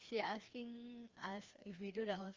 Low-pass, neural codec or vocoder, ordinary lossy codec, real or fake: 7.2 kHz; codec, 16 kHz, 2 kbps, FreqCodec, larger model; Opus, 32 kbps; fake